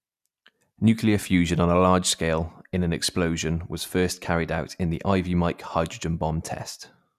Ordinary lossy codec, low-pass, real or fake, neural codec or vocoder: none; 14.4 kHz; real; none